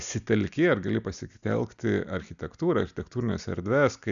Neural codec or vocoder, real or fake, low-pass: none; real; 7.2 kHz